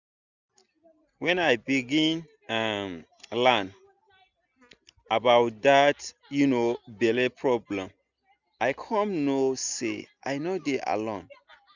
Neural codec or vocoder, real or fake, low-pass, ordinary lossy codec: none; real; 7.2 kHz; none